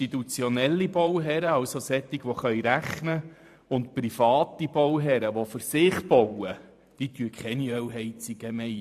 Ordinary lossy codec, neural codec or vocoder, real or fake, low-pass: AAC, 96 kbps; vocoder, 44.1 kHz, 128 mel bands every 512 samples, BigVGAN v2; fake; 14.4 kHz